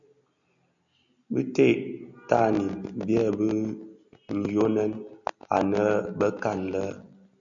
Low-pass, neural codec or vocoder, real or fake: 7.2 kHz; none; real